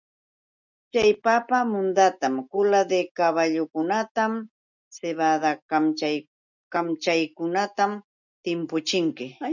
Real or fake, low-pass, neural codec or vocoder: real; 7.2 kHz; none